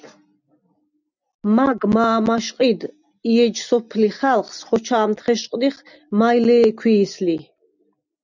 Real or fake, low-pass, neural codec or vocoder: real; 7.2 kHz; none